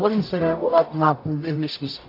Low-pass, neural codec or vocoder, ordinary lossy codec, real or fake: 5.4 kHz; codec, 44.1 kHz, 0.9 kbps, DAC; AAC, 32 kbps; fake